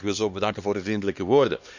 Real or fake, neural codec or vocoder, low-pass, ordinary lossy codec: fake; codec, 16 kHz, 2 kbps, X-Codec, HuBERT features, trained on LibriSpeech; 7.2 kHz; none